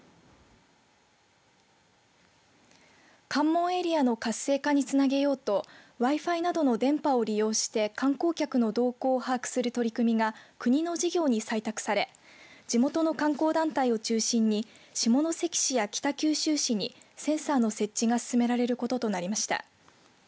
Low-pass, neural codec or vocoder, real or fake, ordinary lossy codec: none; none; real; none